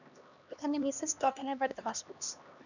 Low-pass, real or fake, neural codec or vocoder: 7.2 kHz; fake; codec, 16 kHz, 2 kbps, X-Codec, HuBERT features, trained on LibriSpeech